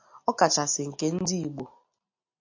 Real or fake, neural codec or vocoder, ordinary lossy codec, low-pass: real; none; AAC, 48 kbps; 7.2 kHz